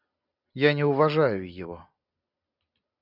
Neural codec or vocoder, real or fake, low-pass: none; real; 5.4 kHz